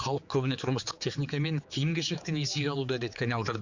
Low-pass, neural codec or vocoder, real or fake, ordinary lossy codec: 7.2 kHz; codec, 16 kHz, 4 kbps, X-Codec, HuBERT features, trained on general audio; fake; Opus, 64 kbps